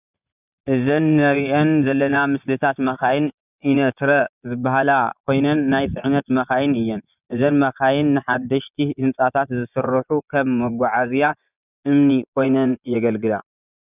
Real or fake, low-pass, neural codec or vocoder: fake; 3.6 kHz; vocoder, 24 kHz, 100 mel bands, Vocos